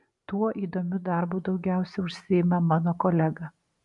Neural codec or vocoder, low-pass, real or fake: none; 10.8 kHz; real